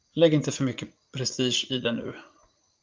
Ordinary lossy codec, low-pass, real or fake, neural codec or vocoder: Opus, 32 kbps; 7.2 kHz; fake; vocoder, 44.1 kHz, 80 mel bands, Vocos